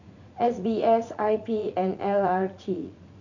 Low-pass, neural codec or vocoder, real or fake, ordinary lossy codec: 7.2 kHz; vocoder, 44.1 kHz, 80 mel bands, Vocos; fake; none